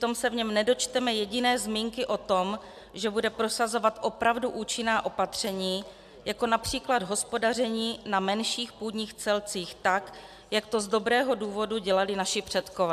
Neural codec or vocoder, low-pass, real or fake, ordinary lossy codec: none; 14.4 kHz; real; AAC, 96 kbps